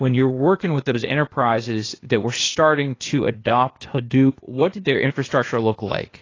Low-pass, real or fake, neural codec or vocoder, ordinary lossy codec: 7.2 kHz; fake; codec, 16 kHz, 0.8 kbps, ZipCodec; AAC, 32 kbps